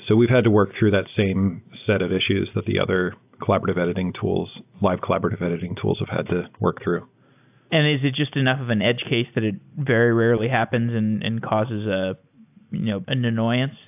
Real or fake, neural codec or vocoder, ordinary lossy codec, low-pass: real; none; AAC, 32 kbps; 3.6 kHz